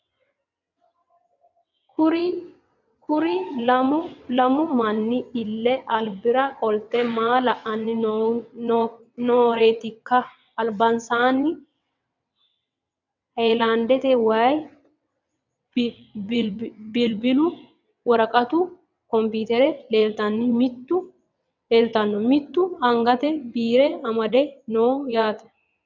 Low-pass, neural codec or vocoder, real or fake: 7.2 kHz; vocoder, 22.05 kHz, 80 mel bands, WaveNeXt; fake